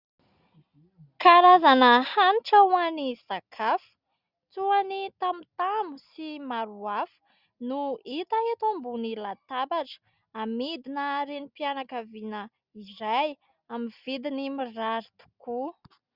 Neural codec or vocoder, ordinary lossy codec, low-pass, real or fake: none; Opus, 64 kbps; 5.4 kHz; real